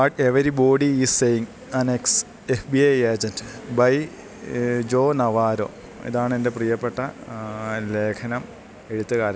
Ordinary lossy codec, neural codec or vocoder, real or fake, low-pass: none; none; real; none